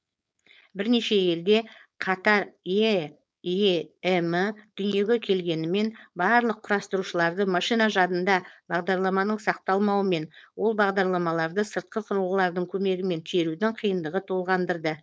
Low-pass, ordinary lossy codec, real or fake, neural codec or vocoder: none; none; fake; codec, 16 kHz, 4.8 kbps, FACodec